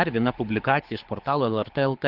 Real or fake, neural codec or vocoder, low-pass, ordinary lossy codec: fake; codec, 24 kHz, 6 kbps, HILCodec; 5.4 kHz; Opus, 16 kbps